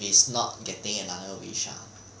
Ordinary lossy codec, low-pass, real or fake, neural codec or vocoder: none; none; real; none